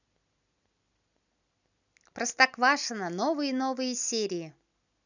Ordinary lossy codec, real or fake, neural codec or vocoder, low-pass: none; real; none; 7.2 kHz